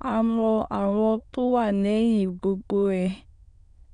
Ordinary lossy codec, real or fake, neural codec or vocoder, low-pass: none; fake; autoencoder, 22.05 kHz, a latent of 192 numbers a frame, VITS, trained on many speakers; 9.9 kHz